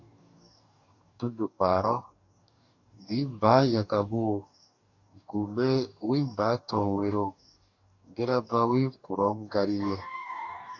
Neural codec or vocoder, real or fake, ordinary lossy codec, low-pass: codec, 44.1 kHz, 2.6 kbps, DAC; fake; AAC, 48 kbps; 7.2 kHz